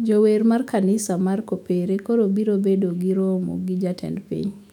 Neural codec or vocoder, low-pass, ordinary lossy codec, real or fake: autoencoder, 48 kHz, 128 numbers a frame, DAC-VAE, trained on Japanese speech; 19.8 kHz; none; fake